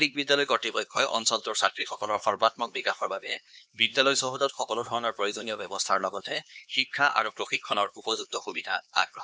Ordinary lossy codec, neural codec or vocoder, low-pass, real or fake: none; codec, 16 kHz, 2 kbps, X-Codec, HuBERT features, trained on LibriSpeech; none; fake